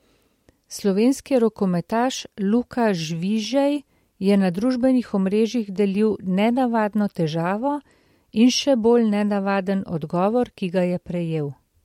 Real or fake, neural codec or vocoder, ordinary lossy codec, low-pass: real; none; MP3, 64 kbps; 19.8 kHz